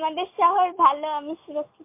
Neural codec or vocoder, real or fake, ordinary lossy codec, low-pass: none; real; MP3, 24 kbps; 3.6 kHz